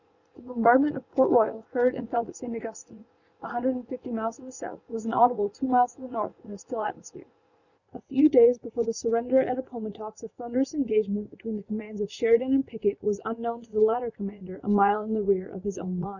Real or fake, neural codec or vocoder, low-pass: fake; vocoder, 44.1 kHz, 80 mel bands, Vocos; 7.2 kHz